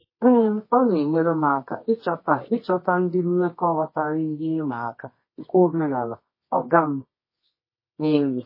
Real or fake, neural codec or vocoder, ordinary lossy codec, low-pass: fake; codec, 24 kHz, 0.9 kbps, WavTokenizer, medium music audio release; MP3, 24 kbps; 5.4 kHz